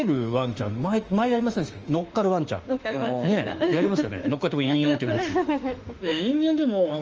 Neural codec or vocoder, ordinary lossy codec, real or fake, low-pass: autoencoder, 48 kHz, 32 numbers a frame, DAC-VAE, trained on Japanese speech; Opus, 24 kbps; fake; 7.2 kHz